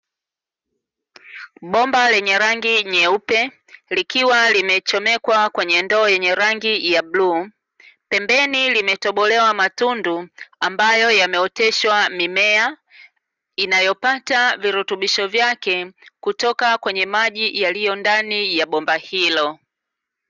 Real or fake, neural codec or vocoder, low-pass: real; none; 7.2 kHz